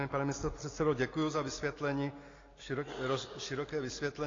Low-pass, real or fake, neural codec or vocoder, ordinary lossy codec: 7.2 kHz; real; none; AAC, 32 kbps